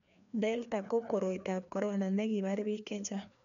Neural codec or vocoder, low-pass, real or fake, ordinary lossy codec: codec, 16 kHz, 2 kbps, FreqCodec, larger model; 7.2 kHz; fake; none